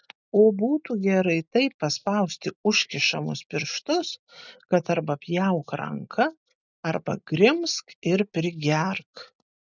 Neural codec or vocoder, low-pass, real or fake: none; 7.2 kHz; real